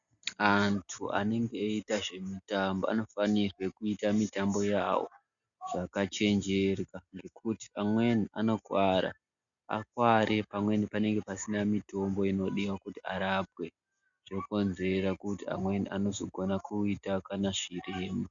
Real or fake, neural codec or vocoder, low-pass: real; none; 7.2 kHz